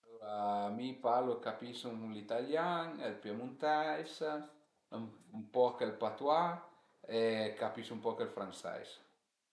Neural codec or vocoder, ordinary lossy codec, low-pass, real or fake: none; none; none; real